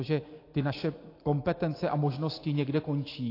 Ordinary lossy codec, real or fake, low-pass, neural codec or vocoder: AAC, 32 kbps; real; 5.4 kHz; none